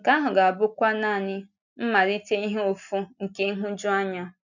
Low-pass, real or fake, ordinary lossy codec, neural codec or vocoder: 7.2 kHz; real; none; none